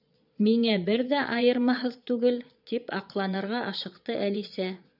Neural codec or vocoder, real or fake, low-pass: vocoder, 22.05 kHz, 80 mel bands, Vocos; fake; 5.4 kHz